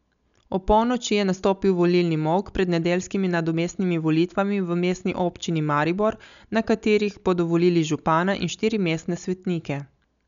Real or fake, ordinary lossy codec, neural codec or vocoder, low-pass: real; none; none; 7.2 kHz